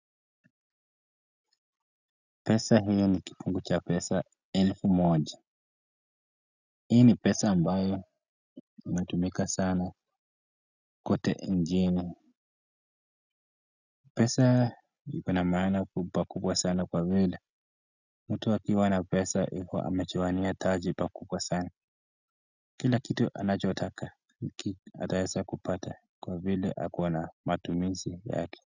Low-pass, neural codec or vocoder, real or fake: 7.2 kHz; none; real